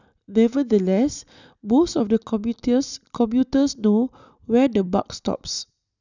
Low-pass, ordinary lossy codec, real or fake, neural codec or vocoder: 7.2 kHz; none; real; none